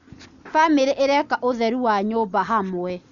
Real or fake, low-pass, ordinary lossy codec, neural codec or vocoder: real; 7.2 kHz; none; none